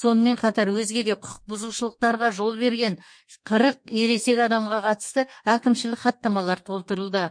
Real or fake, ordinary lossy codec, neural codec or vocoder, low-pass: fake; MP3, 48 kbps; codec, 32 kHz, 1.9 kbps, SNAC; 9.9 kHz